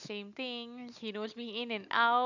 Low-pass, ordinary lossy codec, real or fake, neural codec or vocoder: 7.2 kHz; none; real; none